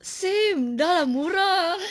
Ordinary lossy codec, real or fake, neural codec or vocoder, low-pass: none; real; none; none